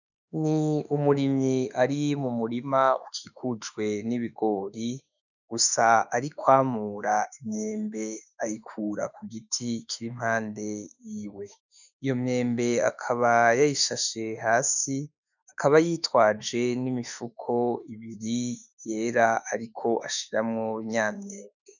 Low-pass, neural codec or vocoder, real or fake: 7.2 kHz; autoencoder, 48 kHz, 32 numbers a frame, DAC-VAE, trained on Japanese speech; fake